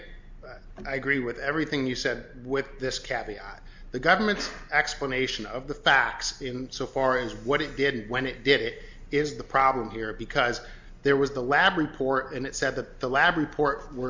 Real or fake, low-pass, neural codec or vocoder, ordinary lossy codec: real; 7.2 kHz; none; MP3, 64 kbps